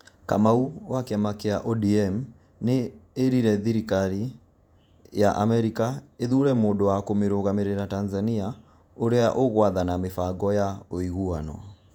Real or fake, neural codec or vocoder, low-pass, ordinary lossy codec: fake; vocoder, 48 kHz, 128 mel bands, Vocos; 19.8 kHz; none